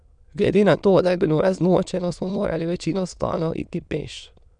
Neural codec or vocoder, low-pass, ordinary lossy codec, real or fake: autoencoder, 22.05 kHz, a latent of 192 numbers a frame, VITS, trained on many speakers; 9.9 kHz; none; fake